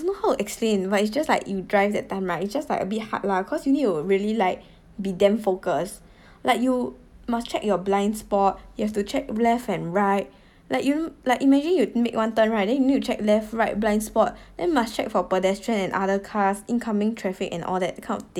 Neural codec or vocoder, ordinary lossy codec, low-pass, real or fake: none; none; 19.8 kHz; real